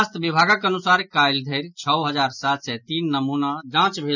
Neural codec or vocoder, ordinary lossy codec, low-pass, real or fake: none; none; 7.2 kHz; real